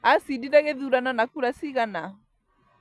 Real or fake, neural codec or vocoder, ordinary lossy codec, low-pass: real; none; none; none